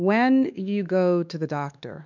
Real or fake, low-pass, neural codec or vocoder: fake; 7.2 kHz; codec, 16 kHz, 2 kbps, X-Codec, WavLM features, trained on Multilingual LibriSpeech